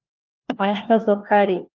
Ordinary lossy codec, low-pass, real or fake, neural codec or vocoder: Opus, 32 kbps; 7.2 kHz; fake; codec, 16 kHz, 1 kbps, FunCodec, trained on LibriTTS, 50 frames a second